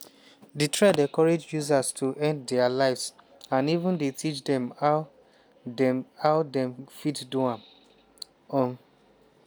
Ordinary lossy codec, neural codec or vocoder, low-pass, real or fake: none; none; none; real